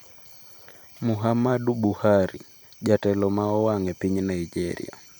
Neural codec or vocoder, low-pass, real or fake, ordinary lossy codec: vocoder, 44.1 kHz, 128 mel bands every 256 samples, BigVGAN v2; none; fake; none